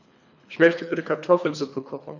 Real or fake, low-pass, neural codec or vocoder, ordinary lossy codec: fake; 7.2 kHz; codec, 24 kHz, 3 kbps, HILCodec; none